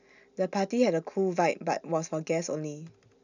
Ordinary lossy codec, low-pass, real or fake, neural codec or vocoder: none; 7.2 kHz; real; none